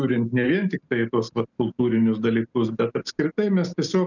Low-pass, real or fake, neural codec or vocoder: 7.2 kHz; real; none